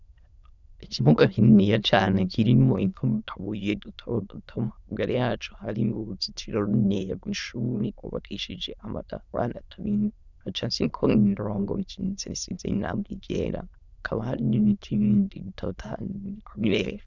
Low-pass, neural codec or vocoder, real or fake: 7.2 kHz; autoencoder, 22.05 kHz, a latent of 192 numbers a frame, VITS, trained on many speakers; fake